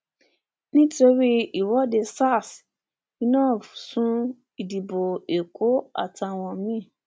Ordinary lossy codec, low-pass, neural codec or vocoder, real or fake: none; none; none; real